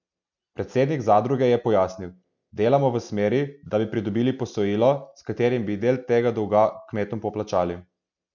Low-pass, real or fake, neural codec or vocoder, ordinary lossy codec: 7.2 kHz; real; none; none